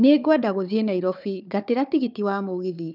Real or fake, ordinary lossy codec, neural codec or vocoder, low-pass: fake; none; codec, 16 kHz, 4 kbps, FunCodec, trained on Chinese and English, 50 frames a second; 5.4 kHz